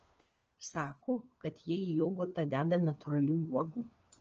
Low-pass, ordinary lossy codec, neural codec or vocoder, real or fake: 7.2 kHz; Opus, 24 kbps; codec, 16 kHz, 4 kbps, FunCodec, trained on LibriTTS, 50 frames a second; fake